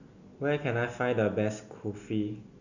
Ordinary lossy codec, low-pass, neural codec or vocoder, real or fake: none; 7.2 kHz; none; real